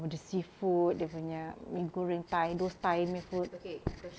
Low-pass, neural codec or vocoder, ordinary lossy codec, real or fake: none; none; none; real